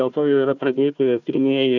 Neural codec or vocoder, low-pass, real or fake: codec, 16 kHz, 1 kbps, FunCodec, trained on Chinese and English, 50 frames a second; 7.2 kHz; fake